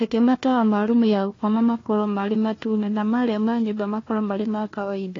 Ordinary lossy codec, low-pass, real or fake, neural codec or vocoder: AAC, 32 kbps; 7.2 kHz; fake; codec, 16 kHz, 1 kbps, FunCodec, trained on Chinese and English, 50 frames a second